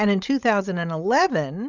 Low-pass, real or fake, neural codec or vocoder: 7.2 kHz; fake; codec, 16 kHz, 16 kbps, FreqCodec, larger model